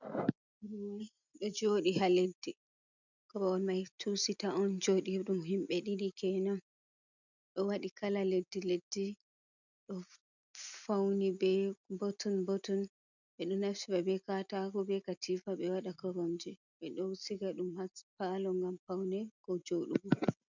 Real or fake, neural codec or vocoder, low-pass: real; none; 7.2 kHz